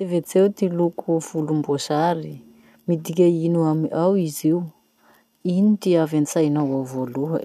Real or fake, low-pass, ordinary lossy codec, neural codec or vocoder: real; 14.4 kHz; none; none